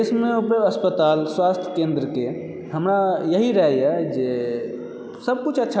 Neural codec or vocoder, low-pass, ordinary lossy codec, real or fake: none; none; none; real